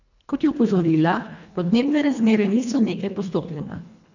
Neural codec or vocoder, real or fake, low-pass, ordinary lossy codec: codec, 24 kHz, 1.5 kbps, HILCodec; fake; 7.2 kHz; none